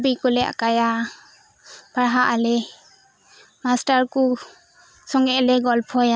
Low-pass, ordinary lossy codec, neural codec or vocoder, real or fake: none; none; none; real